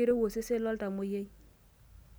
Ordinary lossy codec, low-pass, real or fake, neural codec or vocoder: none; none; real; none